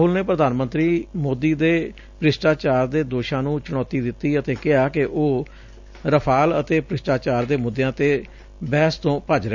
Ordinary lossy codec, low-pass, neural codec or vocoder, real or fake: none; 7.2 kHz; none; real